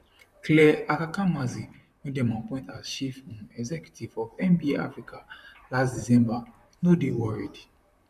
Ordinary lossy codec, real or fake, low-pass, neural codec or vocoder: none; fake; 14.4 kHz; vocoder, 44.1 kHz, 128 mel bands, Pupu-Vocoder